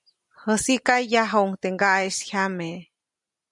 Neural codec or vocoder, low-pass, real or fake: none; 10.8 kHz; real